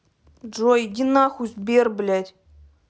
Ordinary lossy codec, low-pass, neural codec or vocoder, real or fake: none; none; none; real